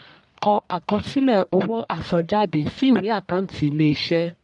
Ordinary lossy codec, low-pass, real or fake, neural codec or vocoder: none; 10.8 kHz; fake; codec, 44.1 kHz, 1.7 kbps, Pupu-Codec